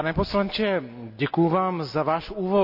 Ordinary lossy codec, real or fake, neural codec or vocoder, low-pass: none; real; none; 5.4 kHz